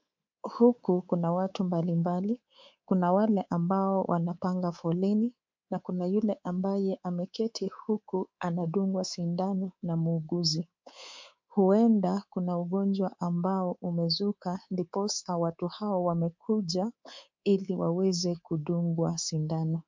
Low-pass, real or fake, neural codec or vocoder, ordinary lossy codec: 7.2 kHz; fake; codec, 24 kHz, 3.1 kbps, DualCodec; MP3, 64 kbps